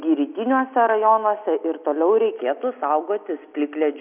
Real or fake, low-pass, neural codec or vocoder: real; 3.6 kHz; none